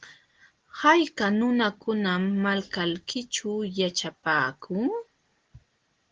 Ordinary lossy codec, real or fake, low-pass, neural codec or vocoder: Opus, 16 kbps; real; 7.2 kHz; none